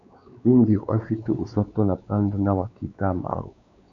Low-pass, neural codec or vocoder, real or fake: 7.2 kHz; codec, 16 kHz, 4 kbps, X-Codec, WavLM features, trained on Multilingual LibriSpeech; fake